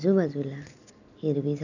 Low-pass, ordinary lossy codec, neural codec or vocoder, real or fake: 7.2 kHz; none; none; real